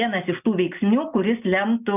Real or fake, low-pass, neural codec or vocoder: real; 3.6 kHz; none